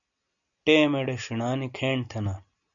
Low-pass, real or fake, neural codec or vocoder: 7.2 kHz; real; none